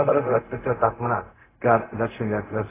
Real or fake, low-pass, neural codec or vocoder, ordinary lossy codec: fake; 3.6 kHz; codec, 16 kHz, 0.4 kbps, LongCat-Audio-Codec; AAC, 16 kbps